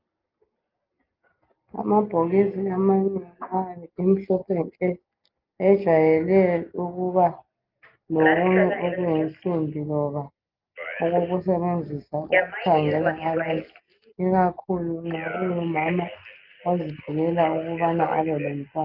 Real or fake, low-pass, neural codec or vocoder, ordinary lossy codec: real; 5.4 kHz; none; Opus, 32 kbps